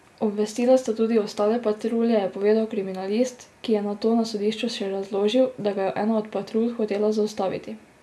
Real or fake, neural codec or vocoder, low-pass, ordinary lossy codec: real; none; none; none